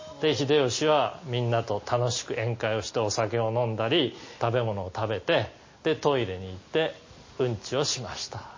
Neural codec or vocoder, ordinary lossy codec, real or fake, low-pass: none; MP3, 32 kbps; real; 7.2 kHz